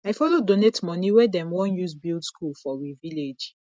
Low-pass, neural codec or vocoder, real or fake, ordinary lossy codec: none; none; real; none